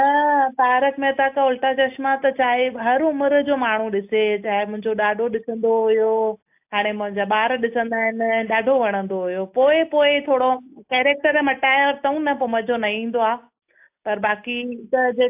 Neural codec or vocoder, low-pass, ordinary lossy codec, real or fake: none; 3.6 kHz; AAC, 32 kbps; real